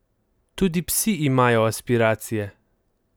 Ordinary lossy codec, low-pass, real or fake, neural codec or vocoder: none; none; real; none